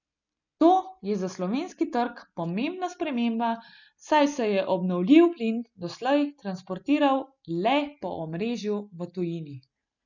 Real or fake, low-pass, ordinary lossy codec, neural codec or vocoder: real; 7.2 kHz; none; none